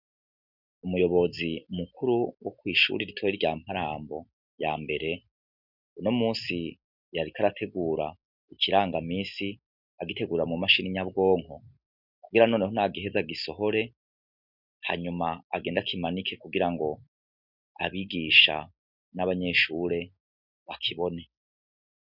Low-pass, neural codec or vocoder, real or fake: 5.4 kHz; none; real